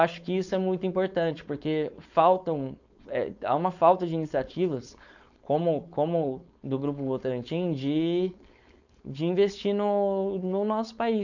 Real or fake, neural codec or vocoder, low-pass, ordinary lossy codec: fake; codec, 16 kHz, 4.8 kbps, FACodec; 7.2 kHz; none